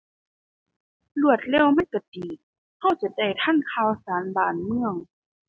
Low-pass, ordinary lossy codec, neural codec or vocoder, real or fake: none; none; none; real